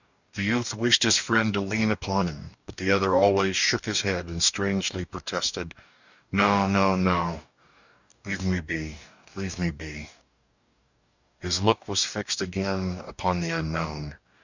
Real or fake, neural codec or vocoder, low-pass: fake; codec, 44.1 kHz, 2.6 kbps, DAC; 7.2 kHz